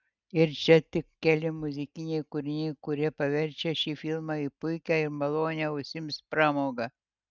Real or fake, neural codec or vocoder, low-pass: real; none; 7.2 kHz